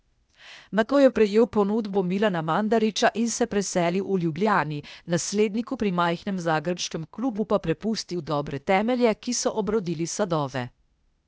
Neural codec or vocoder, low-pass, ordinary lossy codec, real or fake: codec, 16 kHz, 0.8 kbps, ZipCodec; none; none; fake